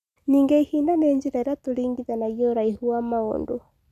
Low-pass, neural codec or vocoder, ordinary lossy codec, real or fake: 14.4 kHz; none; none; real